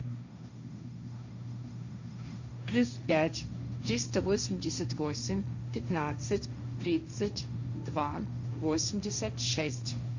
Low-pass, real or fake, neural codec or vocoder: 7.2 kHz; fake; codec, 16 kHz, 1.1 kbps, Voila-Tokenizer